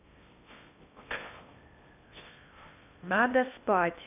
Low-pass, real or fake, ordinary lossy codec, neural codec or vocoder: 3.6 kHz; fake; none; codec, 16 kHz in and 24 kHz out, 0.6 kbps, FocalCodec, streaming, 4096 codes